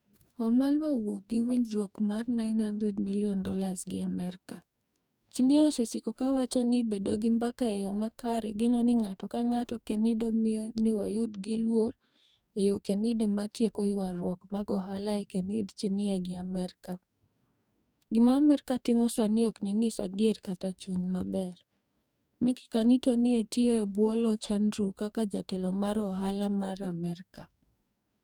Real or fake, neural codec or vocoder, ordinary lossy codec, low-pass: fake; codec, 44.1 kHz, 2.6 kbps, DAC; none; 19.8 kHz